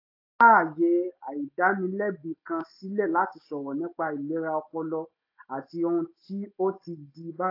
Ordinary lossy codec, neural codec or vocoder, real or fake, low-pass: AAC, 32 kbps; none; real; 5.4 kHz